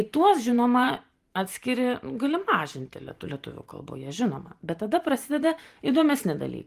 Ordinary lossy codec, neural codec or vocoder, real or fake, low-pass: Opus, 24 kbps; vocoder, 44.1 kHz, 128 mel bands every 512 samples, BigVGAN v2; fake; 14.4 kHz